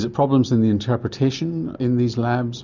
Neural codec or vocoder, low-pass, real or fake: none; 7.2 kHz; real